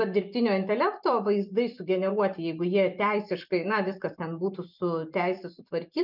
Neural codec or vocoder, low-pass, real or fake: vocoder, 44.1 kHz, 128 mel bands every 256 samples, BigVGAN v2; 5.4 kHz; fake